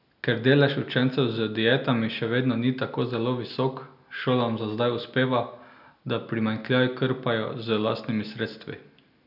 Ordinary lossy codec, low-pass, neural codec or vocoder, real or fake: none; 5.4 kHz; none; real